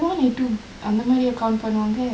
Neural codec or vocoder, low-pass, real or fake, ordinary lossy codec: none; none; real; none